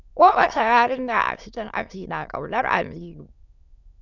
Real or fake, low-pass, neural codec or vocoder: fake; 7.2 kHz; autoencoder, 22.05 kHz, a latent of 192 numbers a frame, VITS, trained on many speakers